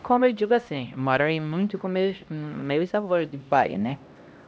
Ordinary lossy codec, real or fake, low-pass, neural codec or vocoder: none; fake; none; codec, 16 kHz, 1 kbps, X-Codec, HuBERT features, trained on LibriSpeech